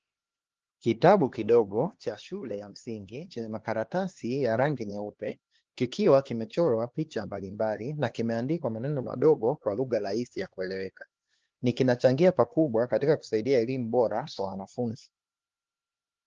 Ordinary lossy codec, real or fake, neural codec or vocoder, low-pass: Opus, 16 kbps; fake; codec, 16 kHz, 2 kbps, X-Codec, HuBERT features, trained on LibriSpeech; 7.2 kHz